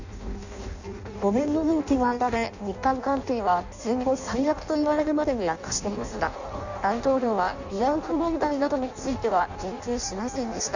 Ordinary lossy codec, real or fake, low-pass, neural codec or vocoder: none; fake; 7.2 kHz; codec, 16 kHz in and 24 kHz out, 0.6 kbps, FireRedTTS-2 codec